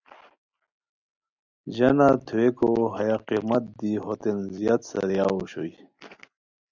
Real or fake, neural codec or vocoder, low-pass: real; none; 7.2 kHz